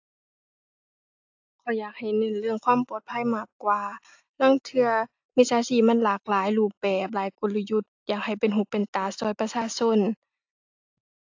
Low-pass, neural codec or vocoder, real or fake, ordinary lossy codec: 7.2 kHz; none; real; AAC, 48 kbps